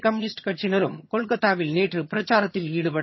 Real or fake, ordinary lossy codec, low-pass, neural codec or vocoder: fake; MP3, 24 kbps; 7.2 kHz; vocoder, 22.05 kHz, 80 mel bands, HiFi-GAN